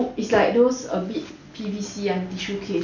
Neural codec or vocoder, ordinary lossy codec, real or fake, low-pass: none; none; real; 7.2 kHz